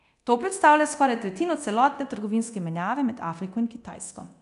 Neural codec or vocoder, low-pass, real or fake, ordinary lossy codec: codec, 24 kHz, 0.9 kbps, DualCodec; 10.8 kHz; fake; none